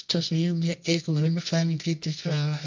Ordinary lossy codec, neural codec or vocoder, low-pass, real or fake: MP3, 64 kbps; codec, 24 kHz, 0.9 kbps, WavTokenizer, medium music audio release; 7.2 kHz; fake